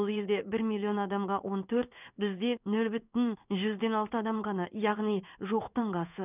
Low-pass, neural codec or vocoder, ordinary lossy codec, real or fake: 3.6 kHz; codec, 16 kHz in and 24 kHz out, 1 kbps, XY-Tokenizer; none; fake